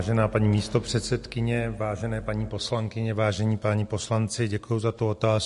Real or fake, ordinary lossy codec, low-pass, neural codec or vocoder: real; MP3, 48 kbps; 14.4 kHz; none